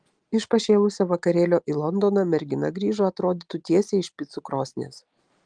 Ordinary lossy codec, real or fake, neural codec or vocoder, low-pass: Opus, 24 kbps; real; none; 9.9 kHz